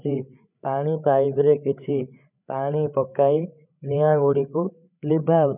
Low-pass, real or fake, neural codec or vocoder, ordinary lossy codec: 3.6 kHz; fake; codec, 16 kHz, 8 kbps, FreqCodec, larger model; none